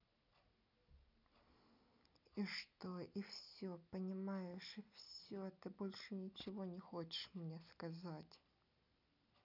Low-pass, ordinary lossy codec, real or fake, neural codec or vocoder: 5.4 kHz; none; real; none